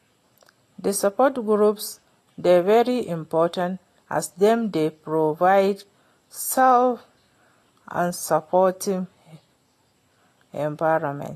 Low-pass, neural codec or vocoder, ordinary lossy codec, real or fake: 14.4 kHz; none; AAC, 48 kbps; real